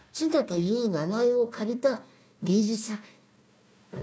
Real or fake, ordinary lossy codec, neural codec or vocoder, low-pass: fake; none; codec, 16 kHz, 1 kbps, FunCodec, trained on Chinese and English, 50 frames a second; none